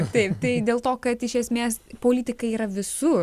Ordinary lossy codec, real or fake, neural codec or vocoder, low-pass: Opus, 64 kbps; real; none; 14.4 kHz